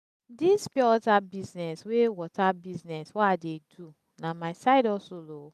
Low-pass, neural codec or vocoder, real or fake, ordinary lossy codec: 14.4 kHz; none; real; none